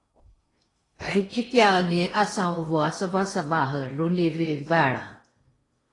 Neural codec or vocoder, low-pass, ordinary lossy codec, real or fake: codec, 16 kHz in and 24 kHz out, 0.8 kbps, FocalCodec, streaming, 65536 codes; 10.8 kHz; AAC, 32 kbps; fake